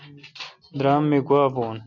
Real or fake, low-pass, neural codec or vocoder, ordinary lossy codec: real; 7.2 kHz; none; MP3, 48 kbps